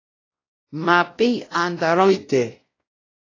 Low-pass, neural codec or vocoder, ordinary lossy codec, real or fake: 7.2 kHz; codec, 16 kHz, 0.5 kbps, X-Codec, WavLM features, trained on Multilingual LibriSpeech; AAC, 32 kbps; fake